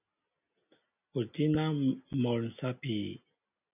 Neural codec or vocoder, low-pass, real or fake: none; 3.6 kHz; real